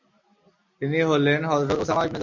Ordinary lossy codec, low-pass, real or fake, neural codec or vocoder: MP3, 48 kbps; 7.2 kHz; real; none